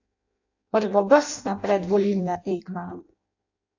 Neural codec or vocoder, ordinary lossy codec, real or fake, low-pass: codec, 16 kHz in and 24 kHz out, 0.6 kbps, FireRedTTS-2 codec; AAC, 48 kbps; fake; 7.2 kHz